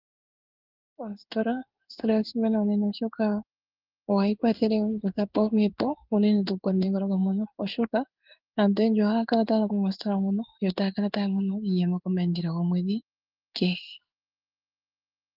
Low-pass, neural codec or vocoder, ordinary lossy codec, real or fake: 5.4 kHz; codec, 16 kHz in and 24 kHz out, 1 kbps, XY-Tokenizer; Opus, 24 kbps; fake